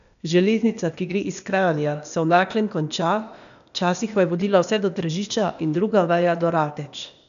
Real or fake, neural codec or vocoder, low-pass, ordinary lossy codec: fake; codec, 16 kHz, 0.8 kbps, ZipCodec; 7.2 kHz; none